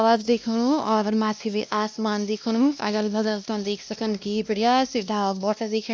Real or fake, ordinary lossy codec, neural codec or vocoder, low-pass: fake; none; codec, 16 kHz, 1 kbps, X-Codec, WavLM features, trained on Multilingual LibriSpeech; none